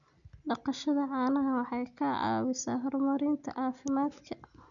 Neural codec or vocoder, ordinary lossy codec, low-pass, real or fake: none; none; 7.2 kHz; real